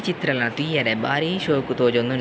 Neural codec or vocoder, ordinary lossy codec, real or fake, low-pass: none; none; real; none